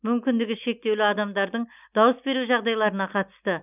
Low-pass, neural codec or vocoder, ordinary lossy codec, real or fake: 3.6 kHz; none; none; real